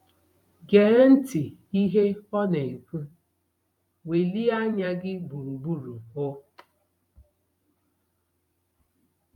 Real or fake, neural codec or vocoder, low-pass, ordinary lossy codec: fake; vocoder, 44.1 kHz, 128 mel bands every 512 samples, BigVGAN v2; 19.8 kHz; none